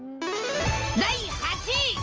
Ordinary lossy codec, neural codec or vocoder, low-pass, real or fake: Opus, 24 kbps; none; 7.2 kHz; real